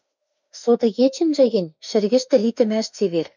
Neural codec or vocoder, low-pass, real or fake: autoencoder, 48 kHz, 32 numbers a frame, DAC-VAE, trained on Japanese speech; 7.2 kHz; fake